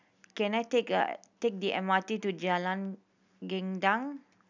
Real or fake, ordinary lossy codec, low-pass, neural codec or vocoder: real; none; 7.2 kHz; none